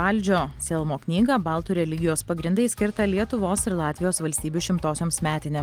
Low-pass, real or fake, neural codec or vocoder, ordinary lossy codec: 19.8 kHz; real; none; Opus, 24 kbps